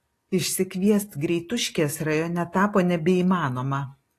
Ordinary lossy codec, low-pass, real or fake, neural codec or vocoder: AAC, 48 kbps; 14.4 kHz; real; none